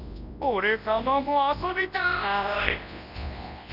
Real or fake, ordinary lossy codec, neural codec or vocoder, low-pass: fake; none; codec, 24 kHz, 0.9 kbps, WavTokenizer, large speech release; 5.4 kHz